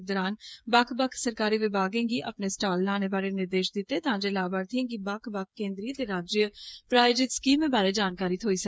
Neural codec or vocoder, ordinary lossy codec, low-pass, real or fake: codec, 16 kHz, 8 kbps, FreqCodec, smaller model; none; none; fake